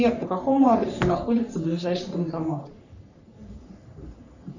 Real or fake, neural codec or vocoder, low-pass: fake; codec, 44.1 kHz, 3.4 kbps, Pupu-Codec; 7.2 kHz